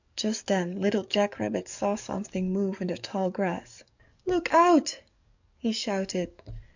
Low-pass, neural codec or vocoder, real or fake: 7.2 kHz; codec, 44.1 kHz, 7.8 kbps, DAC; fake